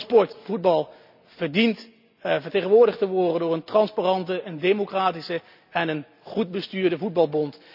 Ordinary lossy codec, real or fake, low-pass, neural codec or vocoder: none; real; 5.4 kHz; none